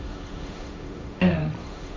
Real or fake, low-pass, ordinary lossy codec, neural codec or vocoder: fake; none; none; codec, 16 kHz, 1.1 kbps, Voila-Tokenizer